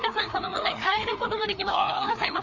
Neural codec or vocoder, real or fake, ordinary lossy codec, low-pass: codec, 16 kHz, 2 kbps, FreqCodec, larger model; fake; none; 7.2 kHz